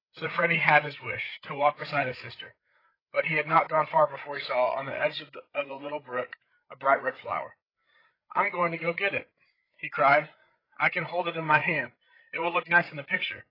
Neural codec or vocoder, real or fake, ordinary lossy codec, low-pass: codec, 16 kHz, 8 kbps, FreqCodec, larger model; fake; AAC, 24 kbps; 5.4 kHz